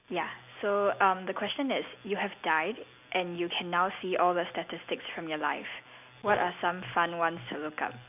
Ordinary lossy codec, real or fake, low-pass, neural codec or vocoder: none; real; 3.6 kHz; none